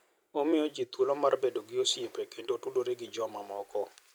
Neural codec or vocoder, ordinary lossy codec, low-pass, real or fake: vocoder, 44.1 kHz, 128 mel bands every 256 samples, BigVGAN v2; none; none; fake